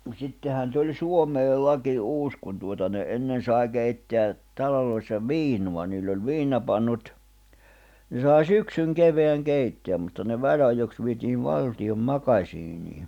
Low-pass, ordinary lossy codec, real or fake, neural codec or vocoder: 19.8 kHz; none; real; none